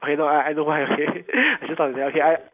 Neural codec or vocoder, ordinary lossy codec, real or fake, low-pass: none; none; real; 3.6 kHz